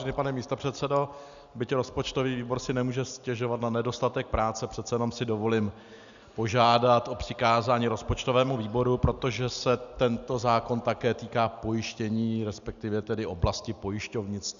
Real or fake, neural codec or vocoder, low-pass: real; none; 7.2 kHz